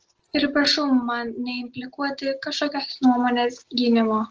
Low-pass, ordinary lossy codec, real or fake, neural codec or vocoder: 7.2 kHz; Opus, 16 kbps; real; none